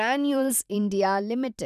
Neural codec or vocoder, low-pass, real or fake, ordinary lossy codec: vocoder, 44.1 kHz, 128 mel bands every 512 samples, BigVGAN v2; 14.4 kHz; fake; none